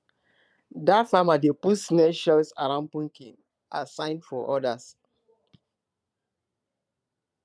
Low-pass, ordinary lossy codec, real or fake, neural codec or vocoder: none; none; fake; vocoder, 22.05 kHz, 80 mel bands, Vocos